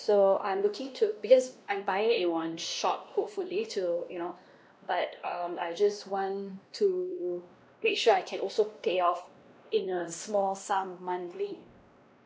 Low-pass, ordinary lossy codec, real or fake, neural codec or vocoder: none; none; fake; codec, 16 kHz, 1 kbps, X-Codec, WavLM features, trained on Multilingual LibriSpeech